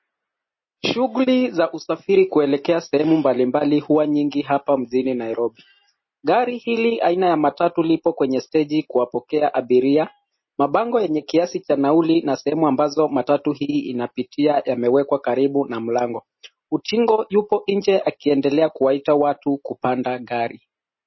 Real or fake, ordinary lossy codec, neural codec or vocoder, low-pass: real; MP3, 24 kbps; none; 7.2 kHz